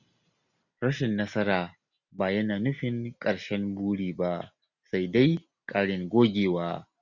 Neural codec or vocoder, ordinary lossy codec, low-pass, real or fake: none; none; 7.2 kHz; real